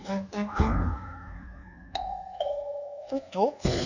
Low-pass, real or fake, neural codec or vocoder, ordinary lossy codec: 7.2 kHz; fake; codec, 24 kHz, 1.2 kbps, DualCodec; none